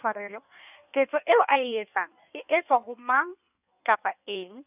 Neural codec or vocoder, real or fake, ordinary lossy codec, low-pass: codec, 16 kHz in and 24 kHz out, 1.1 kbps, FireRedTTS-2 codec; fake; none; 3.6 kHz